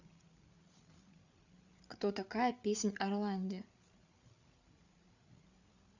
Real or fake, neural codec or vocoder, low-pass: fake; codec, 16 kHz, 8 kbps, FreqCodec, larger model; 7.2 kHz